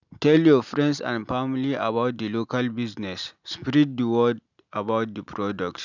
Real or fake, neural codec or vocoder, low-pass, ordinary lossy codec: real; none; 7.2 kHz; none